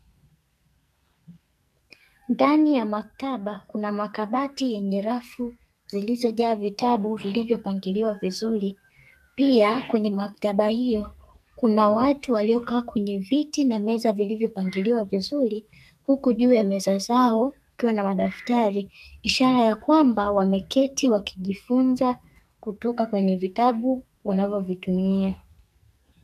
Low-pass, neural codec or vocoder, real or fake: 14.4 kHz; codec, 44.1 kHz, 2.6 kbps, SNAC; fake